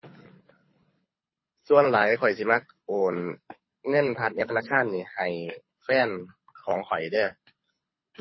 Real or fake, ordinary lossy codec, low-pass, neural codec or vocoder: fake; MP3, 24 kbps; 7.2 kHz; codec, 24 kHz, 6 kbps, HILCodec